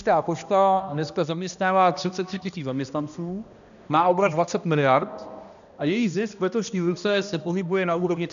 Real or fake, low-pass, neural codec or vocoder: fake; 7.2 kHz; codec, 16 kHz, 1 kbps, X-Codec, HuBERT features, trained on balanced general audio